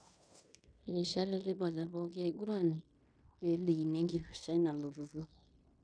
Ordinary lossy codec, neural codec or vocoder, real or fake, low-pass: none; codec, 16 kHz in and 24 kHz out, 0.9 kbps, LongCat-Audio-Codec, fine tuned four codebook decoder; fake; 9.9 kHz